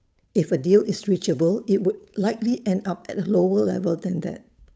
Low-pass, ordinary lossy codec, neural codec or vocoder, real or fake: none; none; codec, 16 kHz, 8 kbps, FunCodec, trained on Chinese and English, 25 frames a second; fake